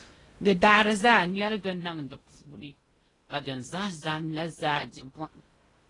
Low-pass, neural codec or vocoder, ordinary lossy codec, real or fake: 10.8 kHz; codec, 16 kHz in and 24 kHz out, 0.6 kbps, FocalCodec, streaming, 2048 codes; AAC, 32 kbps; fake